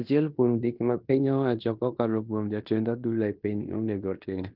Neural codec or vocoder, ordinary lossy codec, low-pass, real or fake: codec, 16 kHz in and 24 kHz out, 0.9 kbps, LongCat-Audio-Codec, fine tuned four codebook decoder; Opus, 24 kbps; 5.4 kHz; fake